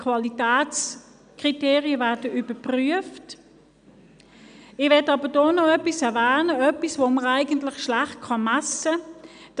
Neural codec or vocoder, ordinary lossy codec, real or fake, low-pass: none; none; real; 9.9 kHz